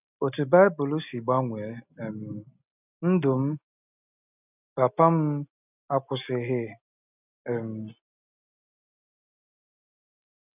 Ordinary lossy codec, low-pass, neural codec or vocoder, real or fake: none; 3.6 kHz; none; real